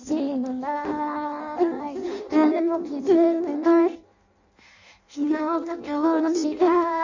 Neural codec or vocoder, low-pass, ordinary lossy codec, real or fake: codec, 16 kHz in and 24 kHz out, 0.6 kbps, FireRedTTS-2 codec; 7.2 kHz; none; fake